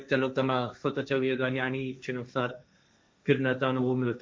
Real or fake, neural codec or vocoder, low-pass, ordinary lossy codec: fake; codec, 16 kHz, 1.1 kbps, Voila-Tokenizer; none; none